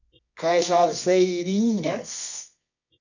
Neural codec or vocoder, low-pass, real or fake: codec, 24 kHz, 0.9 kbps, WavTokenizer, medium music audio release; 7.2 kHz; fake